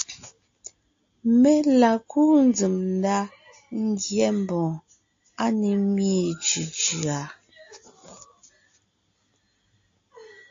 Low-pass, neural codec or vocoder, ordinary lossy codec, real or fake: 7.2 kHz; none; AAC, 48 kbps; real